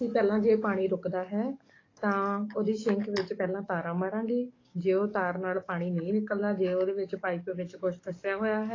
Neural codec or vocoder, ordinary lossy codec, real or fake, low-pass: codec, 44.1 kHz, 7.8 kbps, DAC; AAC, 32 kbps; fake; 7.2 kHz